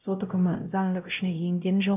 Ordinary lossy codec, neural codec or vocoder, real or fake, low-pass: none; codec, 16 kHz, 0.5 kbps, X-Codec, WavLM features, trained on Multilingual LibriSpeech; fake; 3.6 kHz